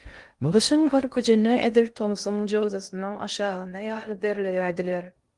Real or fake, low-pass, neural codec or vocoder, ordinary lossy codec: fake; 10.8 kHz; codec, 16 kHz in and 24 kHz out, 0.6 kbps, FocalCodec, streaming, 2048 codes; Opus, 24 kbps